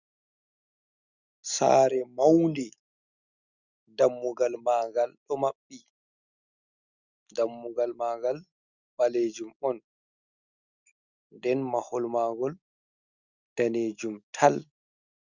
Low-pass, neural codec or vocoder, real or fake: 7.2 kHz; none; real